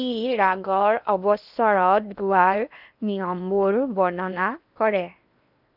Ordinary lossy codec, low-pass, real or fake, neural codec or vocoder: none; 5.4 kHz; fake; codec, 16 kHz in and 24 kHz out, 0.8 kbps, FocalCodec, streaming, 65536 codes